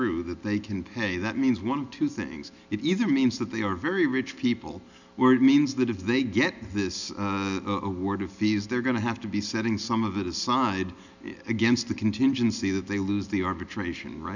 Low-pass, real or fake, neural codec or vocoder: 7.2 kHz; real; none